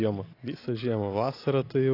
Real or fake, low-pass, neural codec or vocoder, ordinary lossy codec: real; 5.4 kHz; none; AAC, 32 kbps